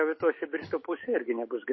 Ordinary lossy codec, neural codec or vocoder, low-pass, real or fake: MP3, 24 kbps; none; 7.2 kHz; real